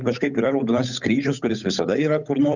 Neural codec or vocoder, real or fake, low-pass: codec, 16 kHz, 8 kbps, FunCodec, trained on Chinese and English, 25 frames a second; fake; 7.2 kHz